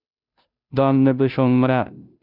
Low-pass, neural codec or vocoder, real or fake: 5.4 kHz; codec, 16 kHz, 0.5 kbps, FunCodec, trained on Chinese and English, 25 frames a second; fake